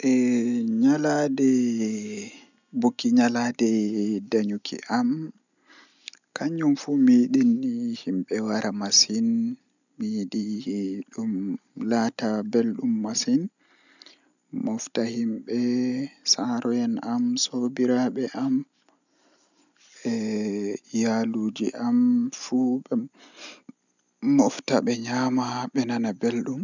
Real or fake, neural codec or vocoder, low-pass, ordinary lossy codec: real; none; 7.2 kHz; none